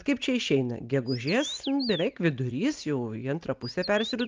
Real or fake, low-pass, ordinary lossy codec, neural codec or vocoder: real; 7.2 kHz; Opus, 32 kbps; none